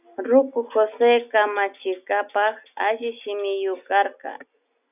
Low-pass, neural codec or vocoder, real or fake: 3.6 kHz; none; real